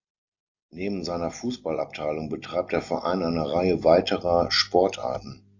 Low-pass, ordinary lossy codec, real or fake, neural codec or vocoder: 7.2 kHz; AAC, 48 kbps; real; none